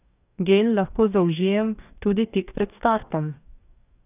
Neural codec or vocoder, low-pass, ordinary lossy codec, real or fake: codec, 44.1 kHz, 2.6 kbps, DAC; 3.6 kHz; none; fake